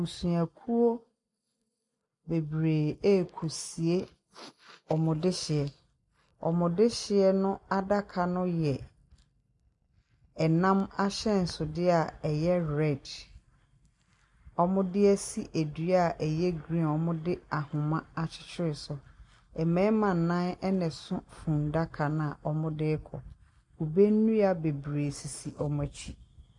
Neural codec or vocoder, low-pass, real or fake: none; 10.8 kHz; real